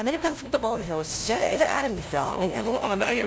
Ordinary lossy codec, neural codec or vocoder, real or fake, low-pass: none; codec, 16 kHz, 0.5 kbps, FunCodec, trained on LibriTTS, 25 frames a second; fake; none